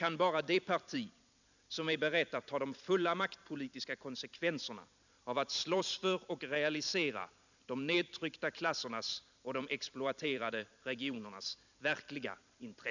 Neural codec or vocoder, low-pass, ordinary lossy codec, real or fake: vocoder, 44.1 kHz, 128 mel bands every 256 samples, BigVGAN v2; 7.2 kHz; none; fake